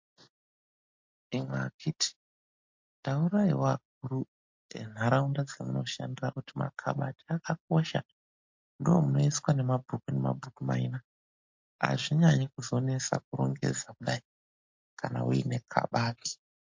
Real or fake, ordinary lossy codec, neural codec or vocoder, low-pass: real; MP3, 48 kbps; none; 7.2 kHz